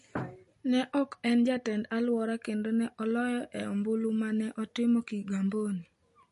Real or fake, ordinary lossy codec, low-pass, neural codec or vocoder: real; MP3, 48 kbps; 10.8 kHz; none